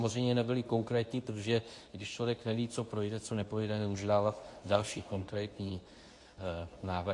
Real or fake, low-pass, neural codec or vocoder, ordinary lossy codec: fake; 10.8 kHz; codec, 24 kHz, 0.9 kbps, WavTokenizer, medium speech release version 2; AAC, 48 kbps